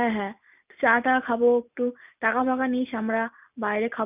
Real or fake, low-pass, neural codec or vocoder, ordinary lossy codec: real; 3.6 kHz; none; none